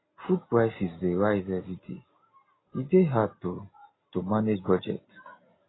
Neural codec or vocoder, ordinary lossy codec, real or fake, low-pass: none; AAC, 16 kbps; real; 7.2 kHz